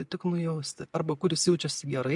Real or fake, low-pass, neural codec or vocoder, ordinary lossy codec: real; 10.8 kHz; none; MP3, 64 kbps